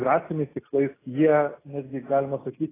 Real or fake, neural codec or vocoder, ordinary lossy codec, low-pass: real; none; AAC, 16 kbps; 3.6 kHz